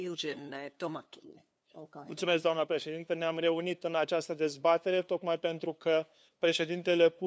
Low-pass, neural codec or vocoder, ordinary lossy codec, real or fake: none; codec, 16 kHz, 2 kbps, FunCodec, trained on LibriTTS, 25 frames a second; none; fake